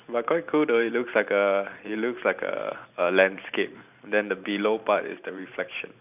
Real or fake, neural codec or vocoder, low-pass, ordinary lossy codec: fake; vocoder, 44.1 kHz, 128 mel bands every 512 samples, BigVGAN v2; 3.6 kHz; none